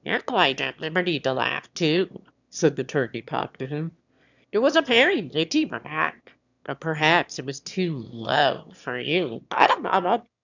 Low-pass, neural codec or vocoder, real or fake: 7.2 kHz; autoencoder, 22.05 kHz, a latent of 192 numbers a frame, VITS, trained on one speaker; fake